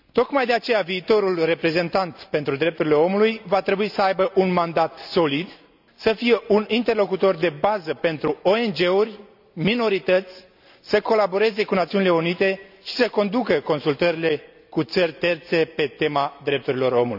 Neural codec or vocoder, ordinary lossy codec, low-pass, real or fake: none; none; 5.4 kHz; real